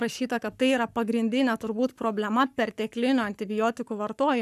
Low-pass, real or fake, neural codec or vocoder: 14.4 kHz; fake; codec, 44.1 kHz, 7.8 kbps, Pupu-Codec